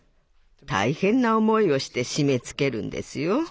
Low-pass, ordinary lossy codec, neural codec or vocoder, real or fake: none; none; none; real